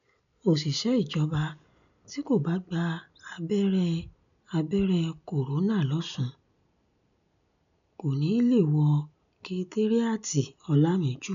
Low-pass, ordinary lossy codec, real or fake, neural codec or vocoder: 7.2 kHz; none; real; none